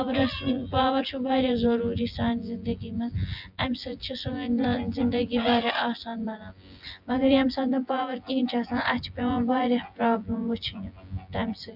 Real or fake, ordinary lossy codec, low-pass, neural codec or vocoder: fake; AAC, 48 kbps; 5.4 kHz; vocoder, 24 kHz, 100 mel bands, Vocos